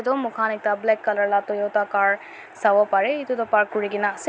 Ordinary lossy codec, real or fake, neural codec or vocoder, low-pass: none; real; none; none